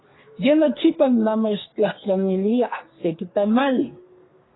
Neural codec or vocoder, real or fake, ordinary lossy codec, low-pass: codec, 32 kHz, 1.9 kbps, SNAC; fake; AAC, 16 kbps; 7.2 kHz